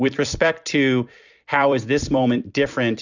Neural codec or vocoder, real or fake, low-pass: vocoder, 44.1 kHz, 128 mel bands every 256 samples, BigVGAN v2; fake; 7.2 kHz